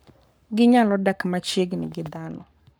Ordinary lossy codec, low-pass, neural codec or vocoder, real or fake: none; none; codec, 44.1 kHz, 7.8 kbps, Pupu-Codec; fake